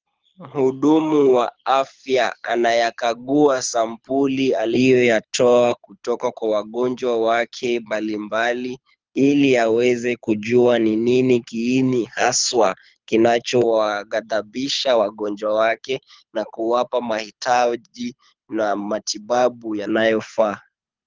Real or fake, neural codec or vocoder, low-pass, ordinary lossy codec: fake; codec, 24 kHz, 6 kbps, HILCodec; 7.2 kHz; Opus, 32 kbps